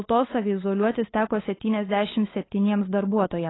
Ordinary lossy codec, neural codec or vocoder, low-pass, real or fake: AAC, 16 kbps; codec, 16 kHz, 8 kbps, FunCodec, trained on LibriTTS, 25 frames a second; 7.2 kHz; fake